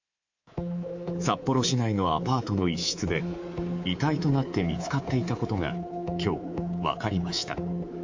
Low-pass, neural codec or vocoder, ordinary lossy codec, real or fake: 7.2 kHz; codec, 24 kHz, 3.1 kbps, DualCodec; AAC, 48 kbps; fake